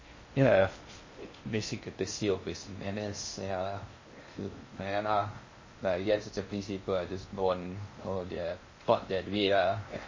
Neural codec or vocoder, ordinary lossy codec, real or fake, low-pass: codec, 16 kHz in and 24 kHz out, 0.6 kbps, FocalCodec, streaming, 2048 codes; MP3, 32 kbps; fake; 7.2 kHz